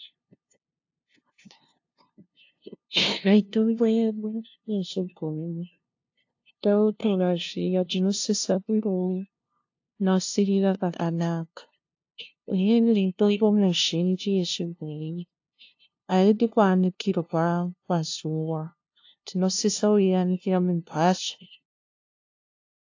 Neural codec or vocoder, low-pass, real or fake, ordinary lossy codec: codec, 16 kHz, 0.5 kbps, FunCodec, trained on LibriTTS, 25 frames a second; 7.2 kHz; fake; AAC, 48 kbps